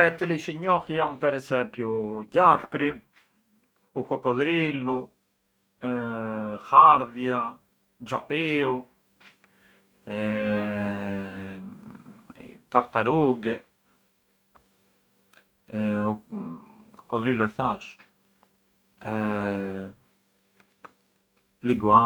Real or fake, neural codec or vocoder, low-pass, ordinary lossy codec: fake; codec, 44.1 kHz, 2.6 kbps, DAC; none; none